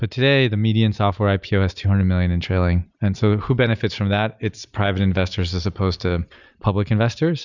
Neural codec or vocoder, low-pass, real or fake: none; 7.2 kHz; real